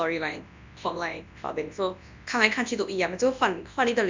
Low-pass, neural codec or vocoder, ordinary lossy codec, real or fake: 7.2 kHz; codec, 24 kHz, 0.9 kbps, WavTokenizer, large speech release; MP3, 64 kbps; fake